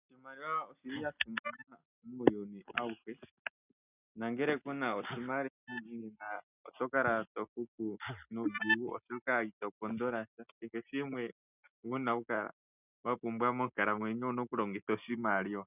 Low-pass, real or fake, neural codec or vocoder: 3.6 kHz; real; none